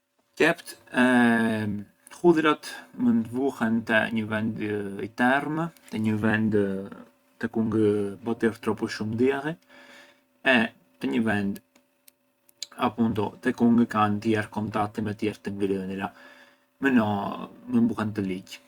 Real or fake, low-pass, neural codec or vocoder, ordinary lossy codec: fake; 19.8 kHz; vocoder, 44.1 kHz, 128 mel bands every 256 samples, BigVGAN v2; Opus, 64 kbps